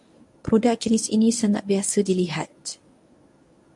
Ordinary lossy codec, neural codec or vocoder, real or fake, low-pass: MP3, 64 kbps; codec, 24 kHz, 0.9 kbps, WavTokenizer, medium speech release version 1; fake; 10.8 kHz